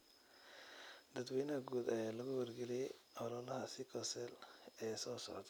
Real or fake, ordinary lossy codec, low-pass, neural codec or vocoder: real; none; none; none